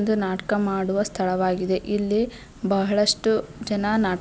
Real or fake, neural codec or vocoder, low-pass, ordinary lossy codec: real; none; none; none